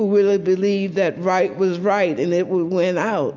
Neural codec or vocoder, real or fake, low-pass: none; real; 7.2 kHz